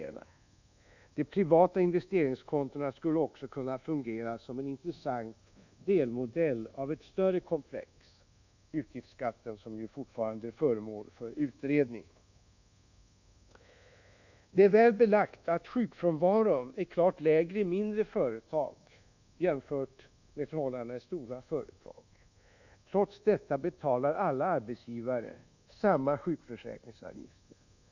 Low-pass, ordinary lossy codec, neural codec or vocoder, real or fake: 7.2 kHz; none; codec, 24 kHz, 1.2 kbps, DualCodec; fake